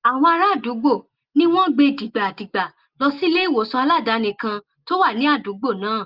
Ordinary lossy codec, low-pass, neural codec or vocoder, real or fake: Opus, 32 kbps; 5.4 kHz; none; real